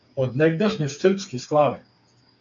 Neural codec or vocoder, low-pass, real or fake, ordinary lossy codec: codec, 16 kHz, 4 kbps, FreqCodec, smaller model; 7.2 kHz; fake; AAC, 64 kbps